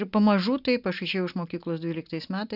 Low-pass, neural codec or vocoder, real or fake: 5.4 kHz; none; real